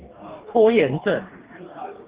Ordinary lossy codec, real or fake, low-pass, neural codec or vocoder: Opus, 16 kbps; fake; 3.6 kHz; codec, 44.1 kHz, 2.6 kbps, DAC